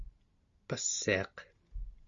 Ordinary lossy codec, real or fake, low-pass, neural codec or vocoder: Opus, 64 kbps; real; 7.2 kHz; none